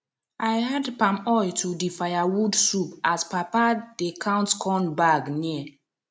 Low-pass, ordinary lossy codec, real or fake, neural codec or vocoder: none; none; real; none